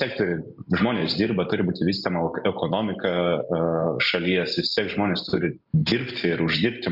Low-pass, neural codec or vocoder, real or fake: 5.4 kHz; none; real